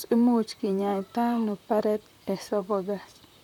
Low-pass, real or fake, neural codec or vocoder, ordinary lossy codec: 19.8 kHz; fake; vocoder, 44.1 kHz, 128 mel bands, Pupu-Vocoder; none